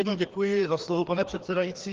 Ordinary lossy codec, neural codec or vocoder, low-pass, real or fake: Opus, 16 kbps; codec, 16 kHz, 2 kbps, FreqCodec, larger model; 7.2 kHz; fake